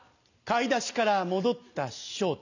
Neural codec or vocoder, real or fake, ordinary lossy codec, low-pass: none; real; none; 7.2 kHz